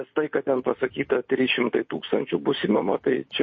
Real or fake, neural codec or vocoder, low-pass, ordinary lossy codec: real; none; 7.2 kHz; MP3, 32 kbps